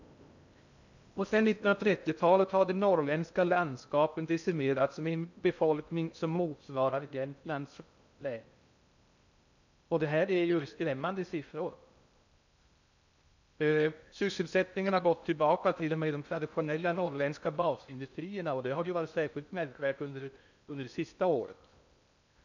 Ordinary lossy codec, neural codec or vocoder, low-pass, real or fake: none; codec, 16 kHz in and 24 kHz out, 0.6 kbps, FocalCodec, streaming, 2048 codes; 7.2 kHz; fake